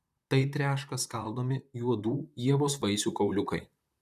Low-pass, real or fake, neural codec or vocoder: 14.4 kHz; fake; vocoder, 44.1 kHz, 128 mel bands, Pupu-Vocoder